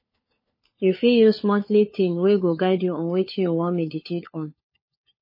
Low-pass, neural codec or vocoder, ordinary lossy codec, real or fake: 5.4 kHz; codec, 16 kHz, 4 kbps, FunCodec, trained on LibriTTS, 50 frames a second; MP3, 24 kbps; fake